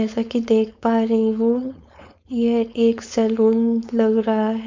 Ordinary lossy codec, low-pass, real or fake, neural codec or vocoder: MP3, 64 kbps; 7.2 kHz; fake; codec, 16 kHz, 4.8 kbps, FACodec